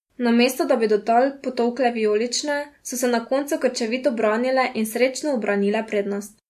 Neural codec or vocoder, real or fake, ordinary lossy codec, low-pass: none; real; MP3, 64 kbps; 14.4 kHz